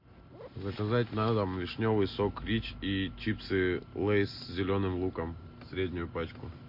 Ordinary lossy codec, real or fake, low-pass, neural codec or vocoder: MP3, 32 kbps; real; 5.4 kHz; none